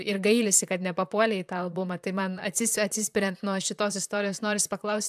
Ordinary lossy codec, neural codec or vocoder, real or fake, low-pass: AAC, 96 kbps; vocoder, 44.1 kHz, 128 mel bands, Pupu-Vocoder; fake; 14.4 kHz